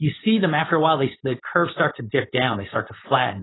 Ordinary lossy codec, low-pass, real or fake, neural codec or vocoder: AAC, 16 kbps; 7.2 kHz; fake; codec, 16 kHz, 4.8 kbps, FACodec